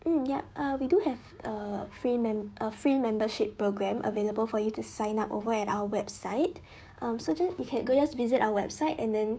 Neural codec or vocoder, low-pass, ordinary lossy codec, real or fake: codec, 16 kHz, 6 kbps, DAC; none; none; fake